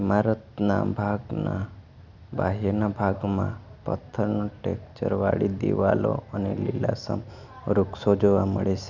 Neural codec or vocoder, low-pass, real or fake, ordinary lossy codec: none; 7.2 kHz; real; none